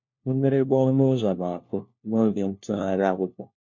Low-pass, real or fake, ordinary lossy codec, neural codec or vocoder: 7.2 kHz; fake; MP3, 48 kbps; codec, 16 kHz, 1 kbps, FunCodec, trained on LibriTTS, 50 frames a second